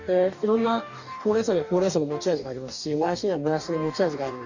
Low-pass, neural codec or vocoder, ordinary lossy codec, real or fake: 7.2 kHz; codec, 44.1 kHz, 2.6 kbps, DAC; none; fake